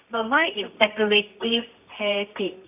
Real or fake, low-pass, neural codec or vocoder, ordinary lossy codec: fake; 3.6 kHz; codec, 24 kHz, 0.9 kbps, WavTokenizer, medium music audio release; none